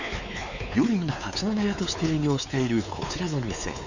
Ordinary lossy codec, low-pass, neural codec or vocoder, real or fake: none; 7.2 kHz; codec, 16 kHz, 4 kbps, X-Codec, WavLM features, trained on Multilingual LibriSpeech; fake